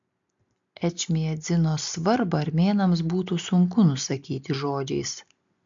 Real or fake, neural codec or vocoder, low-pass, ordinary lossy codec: real; none; 7.2 kHz; MP3, 64 kbps